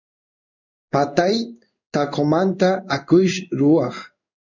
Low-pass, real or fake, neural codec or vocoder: 7.2 kHz; fake; codec, 16 kHz in and 24 kHz out, 1 kbps, XY-Tokenizer